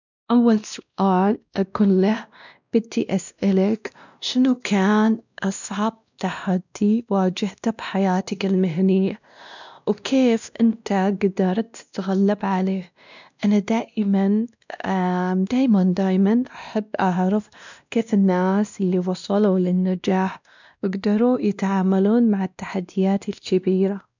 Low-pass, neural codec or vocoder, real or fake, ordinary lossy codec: 7.2 kHz; codec, 16 kHz, 1 kbps, X-Codec, WavLM features, trained on Multilingual LibriSpeech; fake; none